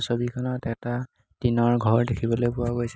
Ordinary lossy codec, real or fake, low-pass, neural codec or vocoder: none; real; none; none